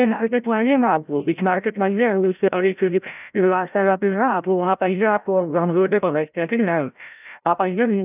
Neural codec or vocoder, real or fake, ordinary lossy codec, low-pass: codec, 16 kHz, 0.5 kbps, FreqCodec, larger model; fake; none; 3.6 kHz